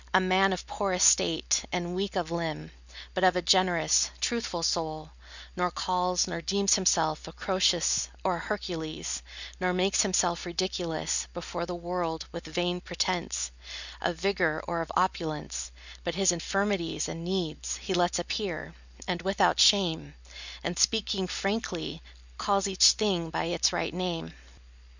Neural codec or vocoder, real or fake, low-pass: none; real; 7.2 kHz